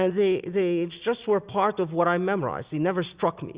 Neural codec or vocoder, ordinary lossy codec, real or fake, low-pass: none; Opus, 64 kbps; real; 3.6 kHz